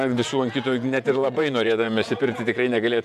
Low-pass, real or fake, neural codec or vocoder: 14.4 kHz; fake; vocoder, 48 kHz, 128 mel bands, Vocos